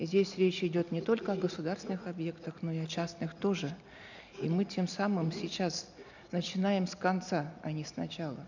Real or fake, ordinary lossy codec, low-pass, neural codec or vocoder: real; none; 7.2 kHz; none